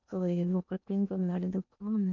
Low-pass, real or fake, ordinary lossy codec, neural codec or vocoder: 7.2 kHz; fake; none; codec, 16 kHz in and 24 kHz out, 0.8 kbps, FocalCodec, streaming, 65536 codes